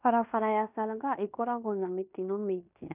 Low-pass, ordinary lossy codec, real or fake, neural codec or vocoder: 3.6 kHz; none; fake; codec, 16 kHz in and 24 kHz out, 0.9 kbps, LongCat-Audio-Codec, fine tuned four codebook decoder